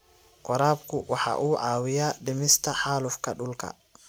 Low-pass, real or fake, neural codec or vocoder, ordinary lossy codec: none; real; none; none